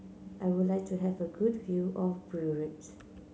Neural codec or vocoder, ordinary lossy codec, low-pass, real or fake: none; none; none; real